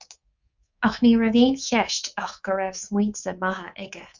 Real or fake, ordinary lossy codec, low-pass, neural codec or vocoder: fake; Opus, 64 kbps; 7.2 kHz; codec, 24 kHz, 3.1 kbps, DualCodec